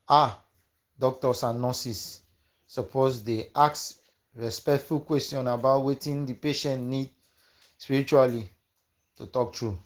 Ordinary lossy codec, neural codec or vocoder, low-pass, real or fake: Opus, 16 kbps; none; 19.8 kHz; real